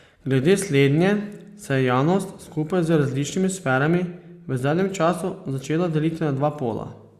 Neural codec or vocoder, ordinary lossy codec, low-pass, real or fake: none; Opus, 64 kbps; 14.4 kHz; real